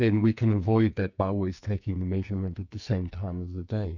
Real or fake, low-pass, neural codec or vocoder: fake; 7.2 kHz; codec, 44.1 kHz, 2.6 kbps, SNAC